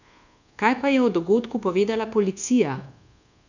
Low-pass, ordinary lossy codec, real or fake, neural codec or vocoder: 7.2 kHz; none; fake; codec, 24 kHz, 1.2 kbps, DualCodec